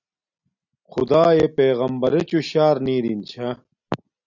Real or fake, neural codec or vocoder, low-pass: real; none; 7.2 kHz